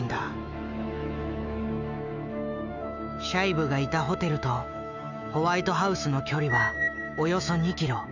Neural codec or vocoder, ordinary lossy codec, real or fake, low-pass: autoencoder, 48 kHz, 128 numbers a frame, DAC-VAE, trained on Japanese speech; none; fake; 7.2 kHz